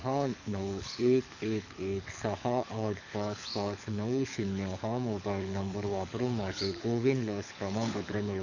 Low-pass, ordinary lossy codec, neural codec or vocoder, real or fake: 7.2 kHz; none; codec, 24 kHz, 6 kbps, HILCodec; fake